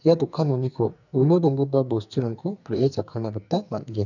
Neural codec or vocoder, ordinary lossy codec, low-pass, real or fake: codec, 44.1 kHz, 2.6 kbps, SNAC; none; 7.2 kHz; fake